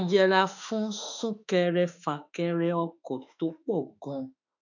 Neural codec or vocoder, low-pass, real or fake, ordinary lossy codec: autoencoder, 48 kHz, 32 numbers a frame, DAC-VAE, trained on Japanese speech; 7.2 kHz; fake; none